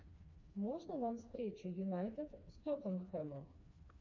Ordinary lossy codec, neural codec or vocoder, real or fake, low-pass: MP3, 48 kbps; codec, 16 kHz, 2 kbps, FreqCodec, smaller model; fake; 7.2 kHz